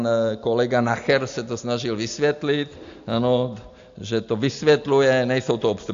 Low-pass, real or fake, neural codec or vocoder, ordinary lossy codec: 7.2 kHz; real; none; AAC, 64 kbps